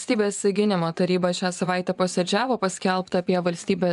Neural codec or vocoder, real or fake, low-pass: vocoder, 24 kHz, 100 mel bands, Vocos; fake; 10.8 kHz